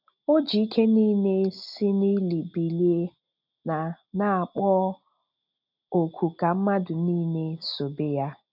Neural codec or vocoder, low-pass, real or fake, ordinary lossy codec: none; 5.4 kHz; real; none